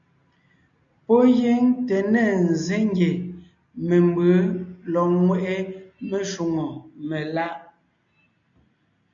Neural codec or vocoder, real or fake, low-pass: none; real; 7.2 kHz